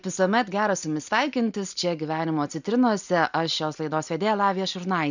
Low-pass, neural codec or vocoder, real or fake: 7.2 kHz; none; real